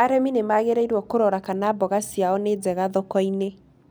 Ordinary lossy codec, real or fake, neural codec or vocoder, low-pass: none; real; none; none